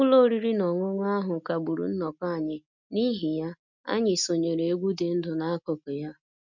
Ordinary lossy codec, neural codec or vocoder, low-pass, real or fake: none; none; 7.2 kHz; real